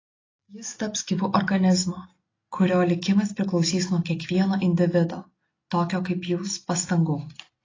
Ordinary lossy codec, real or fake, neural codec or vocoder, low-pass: AAC, 32 kbps; real; none; 7.2 kHz